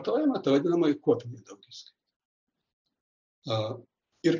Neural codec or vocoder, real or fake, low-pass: none; real; 7.2 kHz